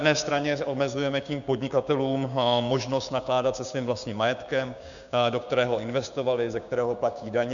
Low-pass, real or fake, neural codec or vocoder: 7.2 kHz; fake; codec, 16 kHz, 6 kbps, DAC